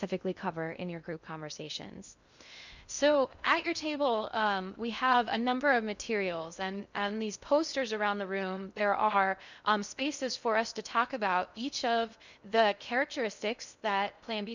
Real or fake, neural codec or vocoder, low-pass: fake; codec, 16 kHz in and 24 kHz out, 0.6 kbps, FocalCodec, streaming, 2048 codes; 7.2 kHz